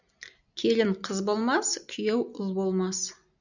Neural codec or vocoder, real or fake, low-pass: none; real; 7.2 kHz